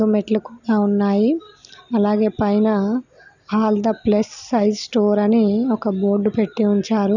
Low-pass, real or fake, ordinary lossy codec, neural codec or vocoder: 7.2 kHz; real; none; none